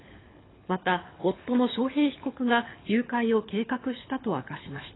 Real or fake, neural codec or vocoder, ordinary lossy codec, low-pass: fake; codec, 24 kHz, 6 kbps, HILCodec; AAC, 16 kbps; 7.2 kHz